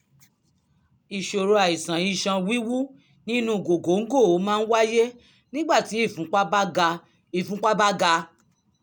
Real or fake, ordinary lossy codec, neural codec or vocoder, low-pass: real; none; none; none